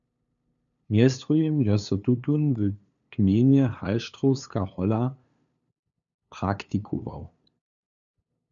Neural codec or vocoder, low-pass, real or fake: codec, 16 kHz, 2 kbps, FunCodec, trained on LibriTTS, 25 frames a second; 7.2 kHz; fake